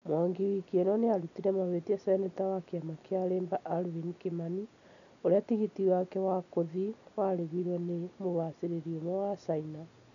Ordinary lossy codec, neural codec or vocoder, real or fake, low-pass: none; none; real; 7.2 kHz